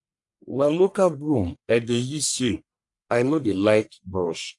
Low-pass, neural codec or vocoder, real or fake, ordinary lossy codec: 10.8 kHz; codec, 44.1 kHz, 1.7 kbps, Pupu-Codec; fake; AAC, 64 kbps